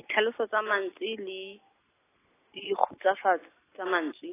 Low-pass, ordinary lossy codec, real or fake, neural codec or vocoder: 3.6 kHz; AAC, 16 kbps; real; none